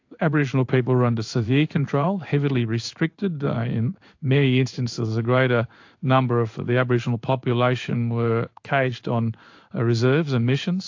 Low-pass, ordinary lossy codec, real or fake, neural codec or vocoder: 7.2 kHz; AAC, 48 kbps; fake; codec, 16 kHz in and 24 kHz out, 1 kbps, XY-Tokenizer